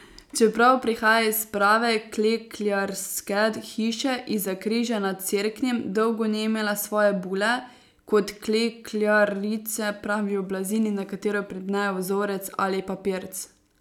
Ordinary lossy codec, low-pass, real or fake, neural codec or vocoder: none; 19.8 kHz; real; none